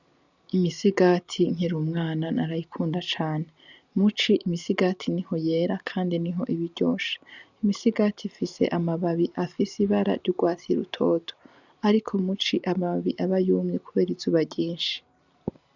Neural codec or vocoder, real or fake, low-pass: none; real; 7.2 kHz